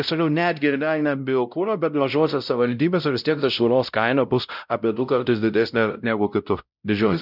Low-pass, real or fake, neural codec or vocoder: 5.4 kHz; fake; codec, 16 kHz, 0.5 kbps, X-Codec, WavLM features, trained on Multilingual LibriSpeech